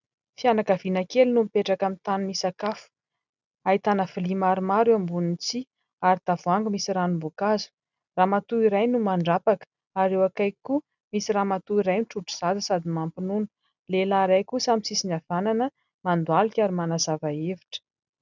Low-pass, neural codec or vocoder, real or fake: 7.2 kHz; none; real